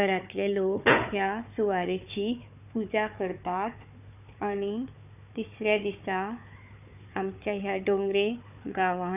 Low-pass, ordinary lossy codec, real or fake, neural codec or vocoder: 3.6 kHz; none; fake; codec, 16 kHz, 4 kbps, FunCodec, trained on Chinese and English, 50 frames a second